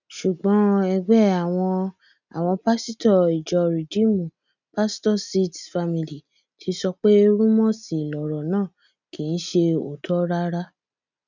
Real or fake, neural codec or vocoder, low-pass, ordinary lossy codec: real; none; 7.2 kHz; none